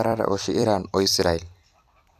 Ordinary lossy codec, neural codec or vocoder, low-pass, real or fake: none; vocoder, 44.1 kHz, 128 mel bands every 256 samples, BigVGAN v2; 14.4 kHz; fake